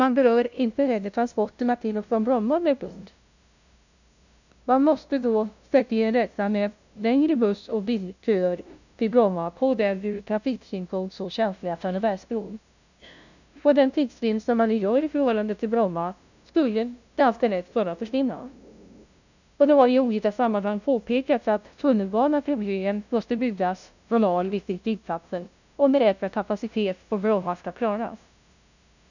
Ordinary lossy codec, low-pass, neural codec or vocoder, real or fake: none; 7.2 kHz; codec, 16 kHz, 0.5 kbps, FunCodec, trained on LibriTTS, 25 frames a second; fake